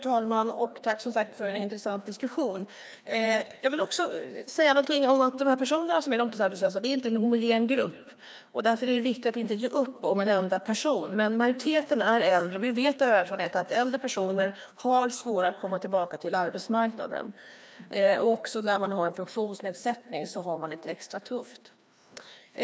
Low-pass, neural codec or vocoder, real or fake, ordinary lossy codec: none; codec, 16 kHz, 1 kbps, FreqCodec, larger model; fake; none